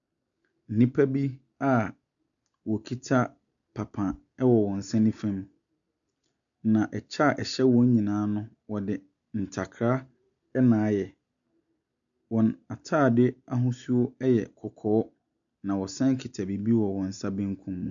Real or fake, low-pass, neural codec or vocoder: real; 7.2 kHz; none